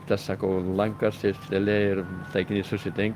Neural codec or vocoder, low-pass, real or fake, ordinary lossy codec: none; 14.4 kHz; real; Opus, 32 kbps